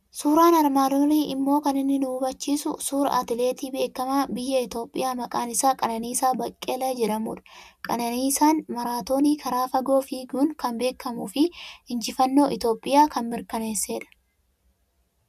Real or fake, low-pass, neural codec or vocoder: real; 14.4 kHz; none